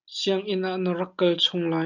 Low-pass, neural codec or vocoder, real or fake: 7.2 kHz; none; real